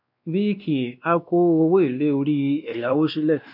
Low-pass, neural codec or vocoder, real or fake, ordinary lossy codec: 5.4 kHz; codec, 16 kHz, 2 kbps, X-Codec, WavLM features, trained on Multilingual LibriSpeech; fake; AAC, 48 kbps